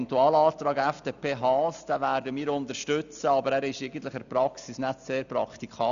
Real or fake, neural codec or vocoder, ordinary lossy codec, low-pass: real; none; none; 7.2 kHz